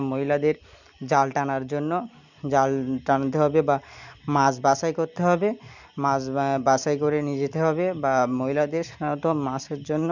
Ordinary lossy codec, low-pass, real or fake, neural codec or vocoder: none; 7.2 kHz; real; none